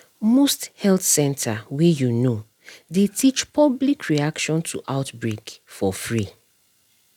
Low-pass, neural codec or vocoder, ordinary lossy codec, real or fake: 19.8 kHz; none; none; real